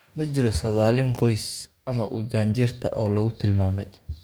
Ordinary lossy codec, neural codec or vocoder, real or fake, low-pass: none; codec, 44.1 kHz, 2.6 kbps, DAC; fake; none